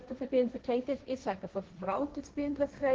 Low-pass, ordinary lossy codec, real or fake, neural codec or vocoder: 7.2 kHz; Opus, 16 kbps; fake; codec, 16 kHz, 1.1 kbps, Voila-Tokenizer